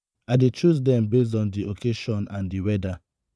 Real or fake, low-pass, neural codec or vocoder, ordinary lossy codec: fake; none; vocoder, 22.05 kHz, 80 mel bands, Vocos; none